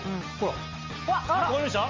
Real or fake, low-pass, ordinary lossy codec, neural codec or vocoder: real; 7.2 kHz; MP3, 48 kbps; none